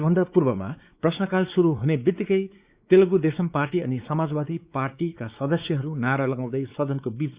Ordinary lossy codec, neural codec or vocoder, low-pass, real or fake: Opus, 64 kbps; codec, 16 kHz, 4 kbps, X-Codec, WavLM features, trained on Multilingual LibriSpeech; 3.6 kHz; fake